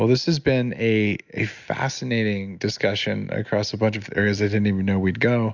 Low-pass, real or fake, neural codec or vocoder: 7.2 kHz; real; none